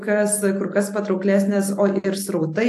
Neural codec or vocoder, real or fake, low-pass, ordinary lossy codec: none; real; 14.4 kHz; AAC, 64 kbps